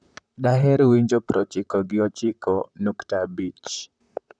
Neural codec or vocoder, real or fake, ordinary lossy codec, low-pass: vocoder, 44.1 kHz, 128 mel bands, Pupu-Vocoder; fake; none; 9.9 kHz